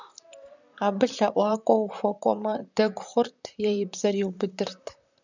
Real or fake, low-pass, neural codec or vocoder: fake; 7.2 kHz; vocoder, 44.1 kHz, 128 mel bands, Pupu-Vocoder